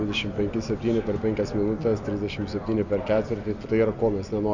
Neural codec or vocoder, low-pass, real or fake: none; 7.2 kHz; real